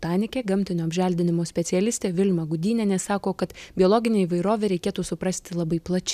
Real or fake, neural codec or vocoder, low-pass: real; none; 14.4 kHz